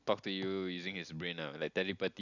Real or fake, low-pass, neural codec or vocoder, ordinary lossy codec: real; 7.2 kHz; none; none